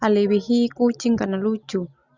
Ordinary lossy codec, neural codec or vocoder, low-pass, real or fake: Opus, 64 kbps; none; 7.2 kHz; real